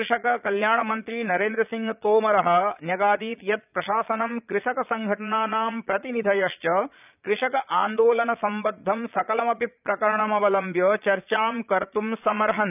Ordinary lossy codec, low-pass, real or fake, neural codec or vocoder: none; 3.6 kHz; fake; vocoder, 22.05 kHz, 80 mel bands, Vocos